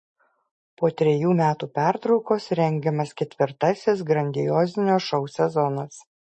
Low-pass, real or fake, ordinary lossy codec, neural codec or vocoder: 10.8 kHz; real; MP3, 32 kbps; none